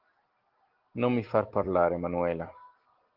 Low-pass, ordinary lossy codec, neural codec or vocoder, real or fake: 5.4 kHz; Opus, 16 kbps; none; real